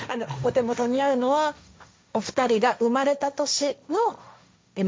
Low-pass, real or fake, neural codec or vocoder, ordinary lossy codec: none; fake; codec, 16 kHz, 1.1 kbps, Voila-Tokenizer; none